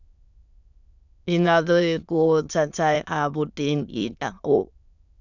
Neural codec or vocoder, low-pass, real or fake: autoencoder, 22.05 kHz, a latent of 192 numbers a frame, VITS, trained on many speakers; 7.2 kHz; fake